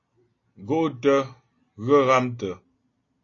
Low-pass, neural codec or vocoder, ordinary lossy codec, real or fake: 7.2 kHz; none; AAC, 32 kbps; real